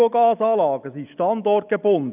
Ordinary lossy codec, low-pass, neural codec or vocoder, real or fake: none; 3.6 kHz; none; real